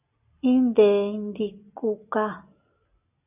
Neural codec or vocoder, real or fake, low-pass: none; real; 3.6 kHz